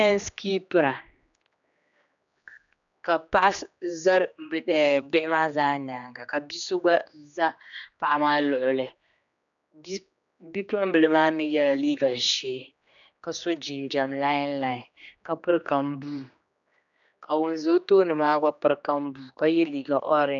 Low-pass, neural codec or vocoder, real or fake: 7.2 kHz; codec, 16 kHz, 2 kbps, X-Codec, HuBERT features, trained on general audio; fake